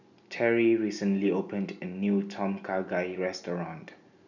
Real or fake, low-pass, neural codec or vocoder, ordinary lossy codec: real; 7.2 kHz; none; none